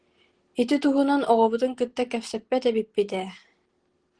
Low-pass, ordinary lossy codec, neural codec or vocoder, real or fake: 9.9 kHz; Opus, 16 kbps; none; real